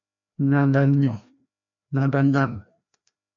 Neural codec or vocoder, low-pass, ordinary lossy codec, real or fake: codec, 16 kHz, 1 kbps, FreqCodec, larger model; 7.2 kHz; MP3, 48 kbps; fake